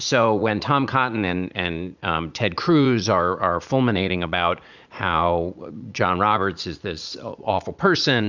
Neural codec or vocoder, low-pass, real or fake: vocoder, 44.1 kHz, 80 mel bands, Vocos; 7.2 kHz; fake